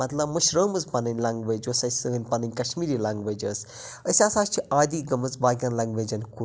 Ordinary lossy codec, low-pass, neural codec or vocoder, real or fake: none; none; none; real